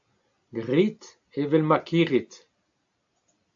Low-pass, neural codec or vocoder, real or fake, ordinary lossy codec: 7.2 kHz; none; real; AAC, 64 kbps